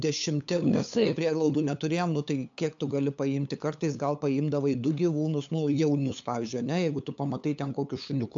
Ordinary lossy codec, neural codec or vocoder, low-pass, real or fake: AAC, 64 kbps; codec, 16 kHz, 8 kbps, FunCodec, trained on LibriTTS, 25 frames a second; 7.2 kHz; fake